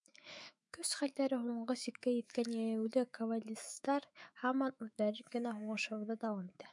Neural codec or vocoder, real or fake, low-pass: autoencoder, 48 kHz, 128 numbers a frame, DAC-VAE, trained on Japanese speech; fake; 10.8 kHz